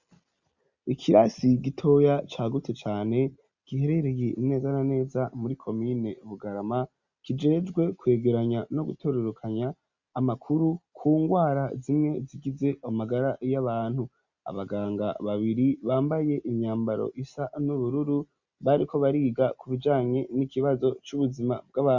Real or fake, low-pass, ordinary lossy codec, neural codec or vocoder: real; 7.2 kHz; Opus, 64 kbps; none